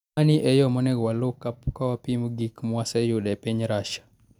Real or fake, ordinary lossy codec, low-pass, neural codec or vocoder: real; none; 19.8 kHz; none